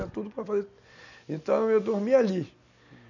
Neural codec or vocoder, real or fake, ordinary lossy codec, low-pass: none; real; none; 7.2 kHz